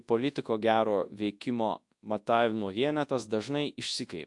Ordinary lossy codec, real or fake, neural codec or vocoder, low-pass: AAC, 64 kbps; fake; codec, 24 kHz, 0.9 kbps, WavTokenizer, large speech release; 10.8 kHz